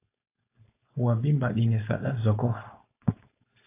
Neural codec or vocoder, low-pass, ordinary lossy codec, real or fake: codec, 16 kHz, 4.8 kbps, FACodec; 3.6 kHz; AAC, 24 kbps; fake